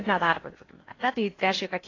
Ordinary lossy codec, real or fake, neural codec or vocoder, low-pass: AAC, 32 kbps; fake; codec, 16 kHz in and 24 kHz out, 0.8 kbps, FocalCodec, streaming, 65536 codes; 7.2 kHz